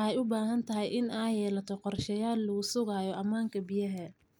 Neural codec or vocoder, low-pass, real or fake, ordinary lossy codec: none; none; real; none